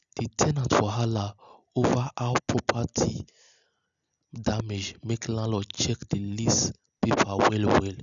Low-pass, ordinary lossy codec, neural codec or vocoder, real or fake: 7.2 kHz; none; none; real